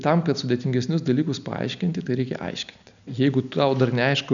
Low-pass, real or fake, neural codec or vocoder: 7.2 kHz; real; none